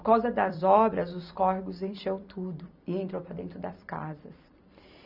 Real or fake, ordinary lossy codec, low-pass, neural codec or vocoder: fake; none; 5.4 kHz; vocoder, 44.1 kHz, 128 mel bands every 256 samples, BigVGAN v2